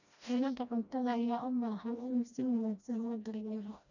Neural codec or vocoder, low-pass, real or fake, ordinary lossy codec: codec, 16 kHz, 1 kbps, FreqCodec, smaller model; 7.2 kHz; fake; none